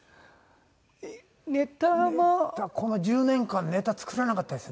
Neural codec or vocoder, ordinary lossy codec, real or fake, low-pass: none; none; real; none